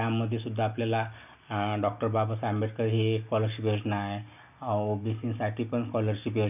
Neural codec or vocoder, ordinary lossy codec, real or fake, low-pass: none; none; real; 3.6 kHz